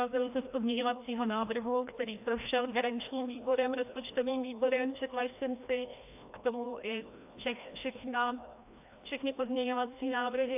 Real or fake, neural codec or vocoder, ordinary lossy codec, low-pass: fake; codec, 16 kHz, 1 kbps, FreqCodec, larger model; AAC, 32 kbps; 3.6 kHz